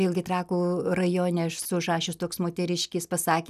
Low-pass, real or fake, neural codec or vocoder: 14.4 kHz; real; none